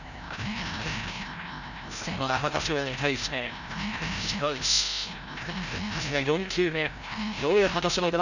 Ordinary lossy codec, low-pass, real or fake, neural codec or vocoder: none; 7.2 kHz; fake; codec, 16 kHz, 0.5 kbps, FreqCodec, larger model